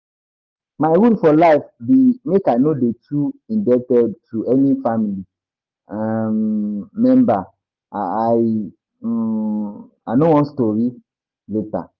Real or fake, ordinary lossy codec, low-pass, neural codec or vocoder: real; Opus, 24 kbps; 7.2 kHz; none